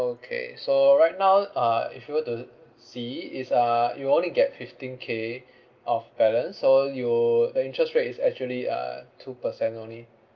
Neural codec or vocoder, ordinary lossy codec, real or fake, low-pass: none; Opus, 32 kbps; real; 7.2 kHz